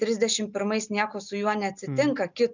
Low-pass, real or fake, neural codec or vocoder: 7.2 kHz; real; none